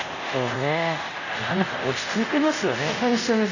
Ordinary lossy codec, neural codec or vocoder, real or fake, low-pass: none; codec, 24 kHz, 0.5 kbps, DualCodec; fake; 7.2 kHz